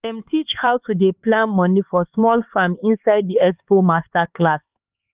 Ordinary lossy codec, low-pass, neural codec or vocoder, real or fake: Opus, 24 kbps; 3.6 kHz; codec, 16 kHz, 4 kbps, X-Codec, HuBERT features, trained on LibriSpeech; fake